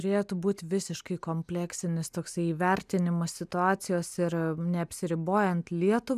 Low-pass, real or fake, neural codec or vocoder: 14.4 kHz; real; none